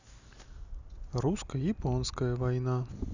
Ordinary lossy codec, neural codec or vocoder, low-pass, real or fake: Opus, 64 kbps; none; 7.2 kHz; real